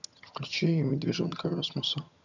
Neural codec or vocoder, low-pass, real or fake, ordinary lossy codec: vocoder, 22.05 kHz, 80 mel bands, HiFi-GAN; 7.2 kHz; fake; none